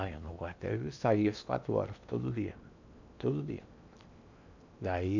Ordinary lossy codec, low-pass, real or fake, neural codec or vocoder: none; 7.2 kHz; fake; codec, 16 kHz in and 24 kHz out, 0.8 kbps, FocalCodec, streaming, 65536 codes